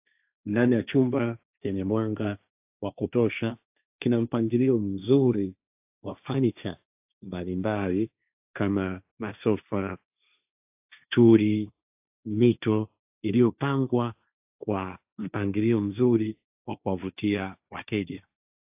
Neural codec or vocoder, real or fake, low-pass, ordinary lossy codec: codec, 16 kHz, 1.1 kbps, Voila-Tokenizer; fake; 3.6 kHz; AAC, 32 kbps